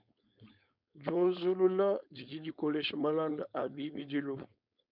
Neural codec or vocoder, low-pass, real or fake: codec, 16 kHz, 4.8 kbps, FACodec; 5.4 kHz; fake